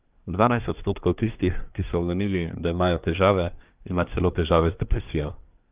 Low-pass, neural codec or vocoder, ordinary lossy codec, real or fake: 3.6 kHz; codec, 24 kHz, 1 kbps, SNAC; Opus, 32 kbps; fake